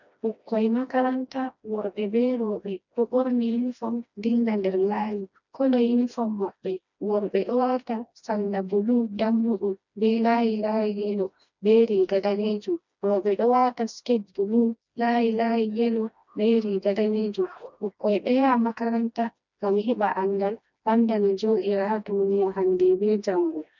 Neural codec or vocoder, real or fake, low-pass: codec, 16 kHz, 1 kbps, FreqCodec, smaller model; fake; 7.2 kHz